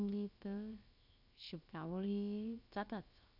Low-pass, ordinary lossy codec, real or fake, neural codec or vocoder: 5.4 kHz; none; fake; codec, 16 kHz, 0.7 kbps, FocalCodec